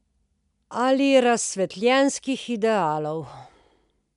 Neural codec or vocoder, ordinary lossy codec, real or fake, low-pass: none; none; real; 10.8 kHz